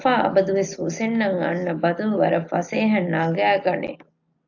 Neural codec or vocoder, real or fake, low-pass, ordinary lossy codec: none; real; 7.2 kHz; AAC, 48 kbps